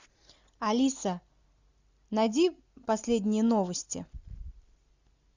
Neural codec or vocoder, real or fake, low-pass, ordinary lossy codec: none; real; 7.2 kHz; Opus, 64 kbps